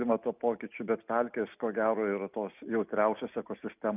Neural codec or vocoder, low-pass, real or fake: none; 3.6 kHz; real